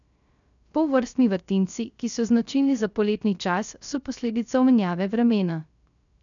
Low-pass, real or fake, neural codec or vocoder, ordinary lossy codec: 7.2 kHz; fake; codec, 16 kHz, 0.3 kbps, FocalCodec; none